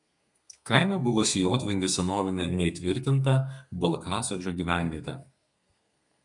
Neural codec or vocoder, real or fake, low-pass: codec, 32 kHz, 1.9 kbps, SNAC; fake; 10.8 kHz